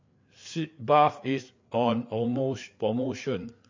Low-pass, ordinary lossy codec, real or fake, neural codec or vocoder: 7.2 kHz; MP3, 48 kbps; fake; codec, 16 kHz, 4 kbps, FreqCodec, larger model